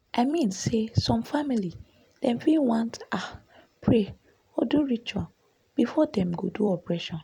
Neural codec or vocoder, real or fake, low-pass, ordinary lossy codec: vocoder, 44.1 kHz, 128 mel bands every 256 samples, BigVGAN v2; fake; 19.8 kHz; none